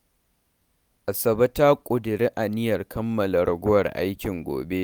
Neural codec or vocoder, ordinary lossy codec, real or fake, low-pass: none; none; real; none